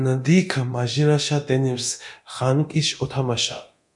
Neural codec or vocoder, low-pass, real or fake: codec, 24 kHz, 0.9 kbps, DualCodec; 10.8 kHz; fake